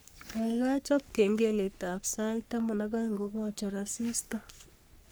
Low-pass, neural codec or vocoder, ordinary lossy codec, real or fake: none; codec, 44.1 kHz, 3.4 kbps, Pupu-Codec; none; fake